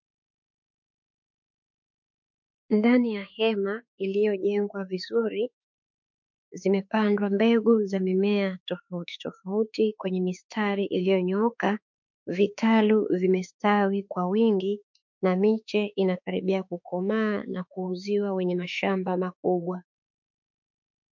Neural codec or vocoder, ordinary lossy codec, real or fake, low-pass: autoencoder, 48 kHz, 32 numbers a frame, DAC-VAE, trained on Japanese speech; MP3, 48 kbps; fake; 7.2 kHz